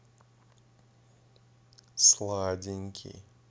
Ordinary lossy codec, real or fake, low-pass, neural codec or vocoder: none; real; none; none